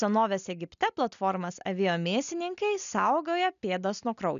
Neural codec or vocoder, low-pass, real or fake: none; 7.2 kHz; real